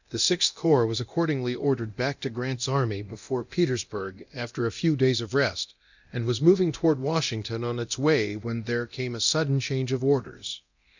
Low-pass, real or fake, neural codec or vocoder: 7.2 kHz; fake; codec, 24 kHz, 0.9 kbps, DualCodec